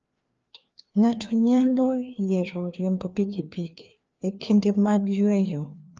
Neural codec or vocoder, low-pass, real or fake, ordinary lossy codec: codec, 16 kHz, 2 kbps, FreqCodec, larger model; 7.2 kHz; fake; Opus, 24 kbps